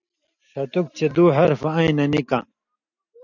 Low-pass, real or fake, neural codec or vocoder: 7.2 kHz; real; none